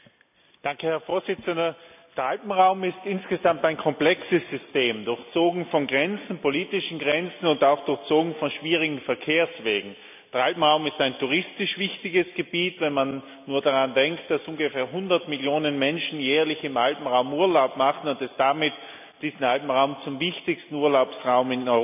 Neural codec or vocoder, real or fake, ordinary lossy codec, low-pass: none; real; none; 3.6 kHz